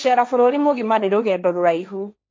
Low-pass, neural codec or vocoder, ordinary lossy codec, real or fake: none; codec, 16 kHz, 1.1 kbps, Voila-Tokenizer; none; fake